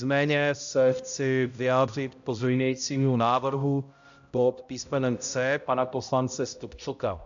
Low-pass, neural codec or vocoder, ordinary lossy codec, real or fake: 7.2 kHz; codec, 16 kHz, 0.5 kbps, X-Codec, HuBERT features, trained on balanced general audio; AAC, 64 kbps; fake